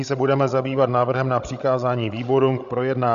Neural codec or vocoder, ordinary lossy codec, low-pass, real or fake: codec, 16 kHz, 16 kbps, FreqCodec, larger model; AAC, 96 kbps; 7.2 kHz; fake